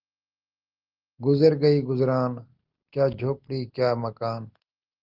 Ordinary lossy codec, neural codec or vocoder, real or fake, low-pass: Opus, 32 kbps; none; real; 5.4 kHz